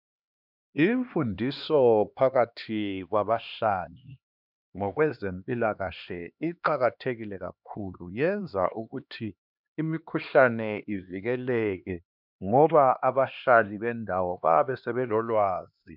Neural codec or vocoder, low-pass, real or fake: codec, 16 kHz, 2 kbps, X-Codec, HuBERT features, trained on LibriSpeech; 5.4 kHz; fake